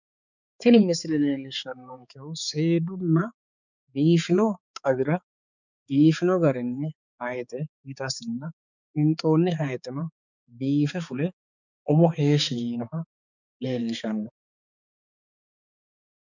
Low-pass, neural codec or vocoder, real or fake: 7.2 kHz; codec, 16 kHz, 4 kbps, X-Codec, HuBERT features, trained on balanced general audio; fake